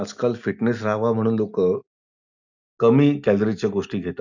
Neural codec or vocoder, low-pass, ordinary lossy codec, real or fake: none; 7.2 kHz; none; real